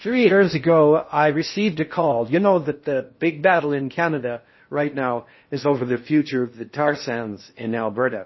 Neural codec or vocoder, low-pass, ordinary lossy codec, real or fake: codec, 16 kHz in and 24 kHz out, 0.6 kbps, FocalCodec, streaming, 4096 codes; 7.2 kHz; MP3, 24 kbps; fake